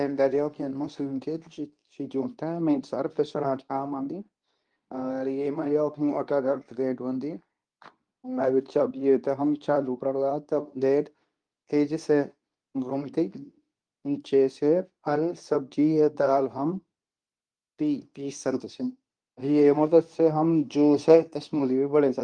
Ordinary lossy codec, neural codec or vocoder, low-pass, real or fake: Opus, 32 kbps; codec, 24 kHz, 0.9 kbps, WavTokenizer, medium speech release version 2; 9.9 kHz; fake